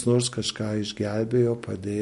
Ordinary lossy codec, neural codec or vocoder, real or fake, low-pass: MP3, 48 kbps; none; real; 14.4 kHz